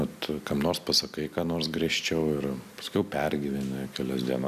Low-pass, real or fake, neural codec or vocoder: 14.4 kHz; real; none